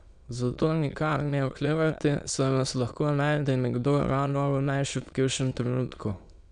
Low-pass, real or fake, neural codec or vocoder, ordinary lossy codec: 9.9 kHz; fake; autoencoder, 22.05 kHz, a latent of 192 numbers a frame, VITS, trained on many speakers; none